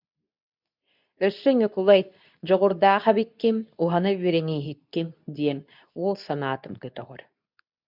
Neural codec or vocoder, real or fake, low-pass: codec, 24 kHz, 0.9 kbps, WavTokenizer, medium speech release version 2; fake; 5.4 kHz